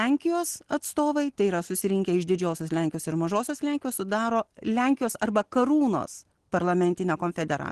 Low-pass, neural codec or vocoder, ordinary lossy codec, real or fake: 10.8 kHz; none; Opus, 16 kbps; real